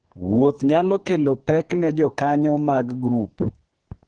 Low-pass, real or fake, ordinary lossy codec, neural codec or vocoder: 9.9 kHz; fake; Opus, 16 kbps; codec, 44.1 kHz, 2.6 kbps, SNAC